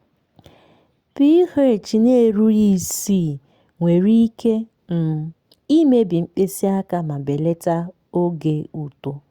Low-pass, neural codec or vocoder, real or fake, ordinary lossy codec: 19.8 kHz; none; real; Opus, 64 kbps